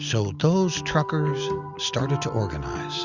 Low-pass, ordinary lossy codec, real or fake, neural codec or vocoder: 7.2 kHz; Opus, 64 kbps; real; none